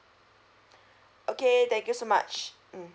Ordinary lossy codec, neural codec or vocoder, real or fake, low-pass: none; none; real; none